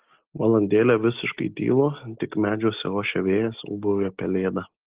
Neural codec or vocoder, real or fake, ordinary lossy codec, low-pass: none; real; Opus, 16 kbps; 3.6 kHz